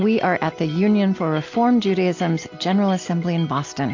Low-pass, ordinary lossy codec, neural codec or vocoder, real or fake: 7.2 kHz; AAC, 48 kbps; none; real